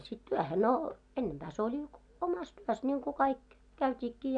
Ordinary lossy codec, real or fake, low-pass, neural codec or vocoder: none; real; 9.9 kHz; none